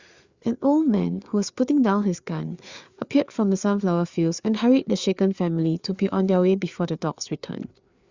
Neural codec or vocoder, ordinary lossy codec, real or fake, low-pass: codec, 16 kHz, 4 kbps, FreqCodec, larger model; Opus, 64 kbps; fake; 7.2 kHz